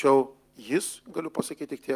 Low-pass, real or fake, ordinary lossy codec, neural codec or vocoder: 14.4 kHz; real; Opus, 32 kbps; none